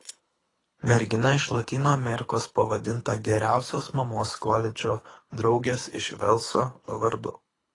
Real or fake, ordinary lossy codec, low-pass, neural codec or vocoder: fake; AAC, 32 kbps; 10.8 kHz; codec, 24 kHz, 3 kbps, HILCodec